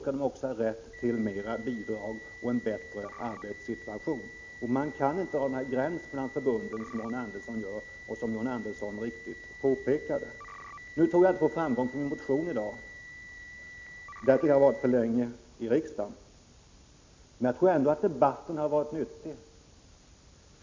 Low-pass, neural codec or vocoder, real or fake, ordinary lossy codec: 7.2 kHz; none; real; MP3, 64 kbps